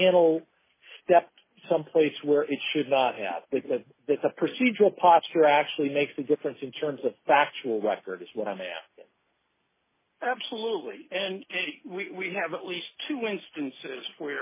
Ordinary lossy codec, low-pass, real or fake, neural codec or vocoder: MP3, 16 kbps; 3.6 kHz; real; none